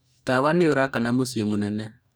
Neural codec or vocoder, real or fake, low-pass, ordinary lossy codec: codec, 44.1 kHz, 2.6 kbps, DAC; fake; none; none